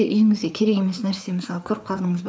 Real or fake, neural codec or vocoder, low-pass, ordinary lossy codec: fake; codec, 16 kHz, 4 kbps, FreqCodec, larger model; none; none